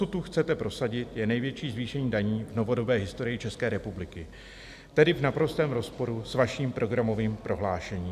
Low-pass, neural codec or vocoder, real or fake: 14.4 kHz; none; real